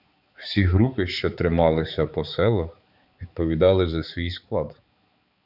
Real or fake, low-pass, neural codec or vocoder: fake; 5.4 kHz; codec, 16 kHz, 4 kbps, X-Codec, HuBERT features, trained on balanced general audio